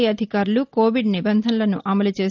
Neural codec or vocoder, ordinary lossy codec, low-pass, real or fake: none; Opus, 32 kbps; 7.2 kHz; real